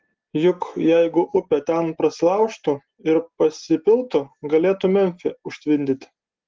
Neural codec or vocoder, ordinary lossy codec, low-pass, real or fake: none; Opus, 16 kbps; 7.2 kHz; real